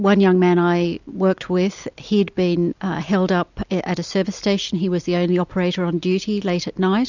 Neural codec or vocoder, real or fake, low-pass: none; real; 7.2 kHz